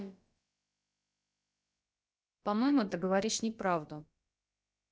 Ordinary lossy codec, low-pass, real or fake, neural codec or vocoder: none; none; fake; codec, 16 kHz, about 1 kbps, DyCAST, with the encoder's durations